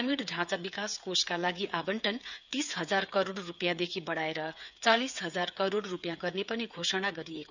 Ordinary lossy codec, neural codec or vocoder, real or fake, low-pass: none; codec, 16 kHz, 8 kbps, FreqCodec, smaller model; fake; 7.2 kHz